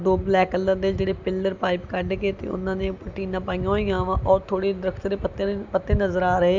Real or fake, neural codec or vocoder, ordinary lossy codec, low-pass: real; none; none; 7.2 kHz